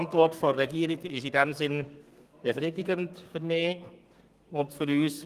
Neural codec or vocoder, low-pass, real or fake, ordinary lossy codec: codec, 32 kHz, 1.9 kbps, SNAC; 14.4 kHz; fake; Opus, 24 kbps